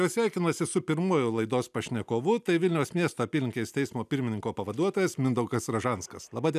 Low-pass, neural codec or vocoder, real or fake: 14.4 kHz; none; real